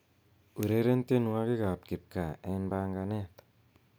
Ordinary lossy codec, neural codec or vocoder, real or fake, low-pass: none; none; real; none